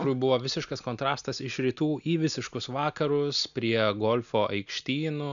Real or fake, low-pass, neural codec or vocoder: real; 7.2 kHz; none